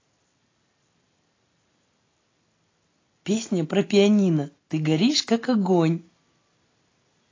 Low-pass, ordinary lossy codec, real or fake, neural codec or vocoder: 7.2 kHz; AAC, 32 kbps; real; none